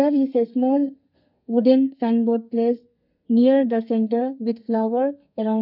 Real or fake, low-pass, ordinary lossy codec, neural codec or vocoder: fake; 5.4 kHz; none; codec, 32 kHz, 1.9 kbps, SNAC